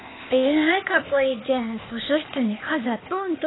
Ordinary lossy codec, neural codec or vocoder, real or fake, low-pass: AAC, 16 kbps; codec, 16 kHz, 0.8 kbps, ZipCodec; fake; 7.2 kHz